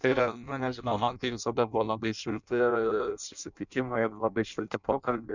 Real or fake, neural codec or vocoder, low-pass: fake; codec, 16 kHz in and 24 kHz out, 0.6 kbps, FireRedTTS-2 codec; 7.2 kHz